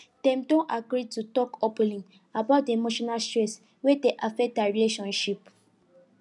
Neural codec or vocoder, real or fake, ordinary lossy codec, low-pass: none; real; none; 10.8 kHz